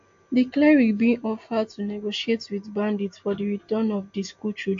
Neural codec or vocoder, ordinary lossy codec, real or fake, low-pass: none; AAC, 48 kbps; real; 7.2 kHz